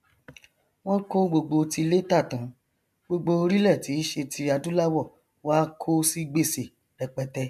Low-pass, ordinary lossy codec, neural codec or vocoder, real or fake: 14.4 kHz; MP3, 96 kbps; none; real